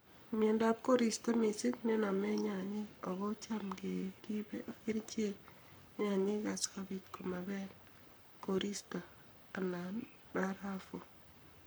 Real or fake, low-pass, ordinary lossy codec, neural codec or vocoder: fake; none; none; codec, 44.1 kHz, 7.8 kbps, Pupu-Codec